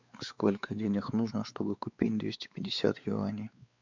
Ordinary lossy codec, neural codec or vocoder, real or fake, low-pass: MP3, 64 kbps; codec, 16 kHz, 4 kbps, X-Codec, WavLM features, trained on Multilingual LibriSpeech; fake; 7.2 kHz